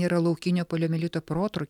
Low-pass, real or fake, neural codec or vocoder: 19.8 kHz; real; none